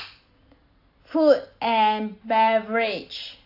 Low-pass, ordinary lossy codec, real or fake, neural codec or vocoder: 5.4 kHz; AAC, 32 kbps; real; none